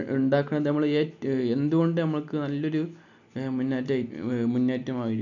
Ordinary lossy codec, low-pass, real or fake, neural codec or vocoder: none; 7.2 kHz; real; none